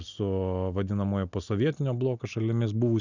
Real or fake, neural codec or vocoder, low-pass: real; none; 7.2 kHz